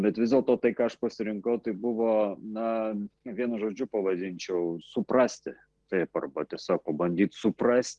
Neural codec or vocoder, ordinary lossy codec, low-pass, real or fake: none; Opus, 16 kbps; 10.8 kHz; real